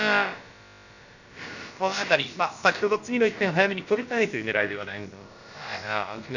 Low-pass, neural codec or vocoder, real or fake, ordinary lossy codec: 7.2 kHz; codec, 16 kHz, about 1 kbps, DyCAST, with the encoder's durations; fake; none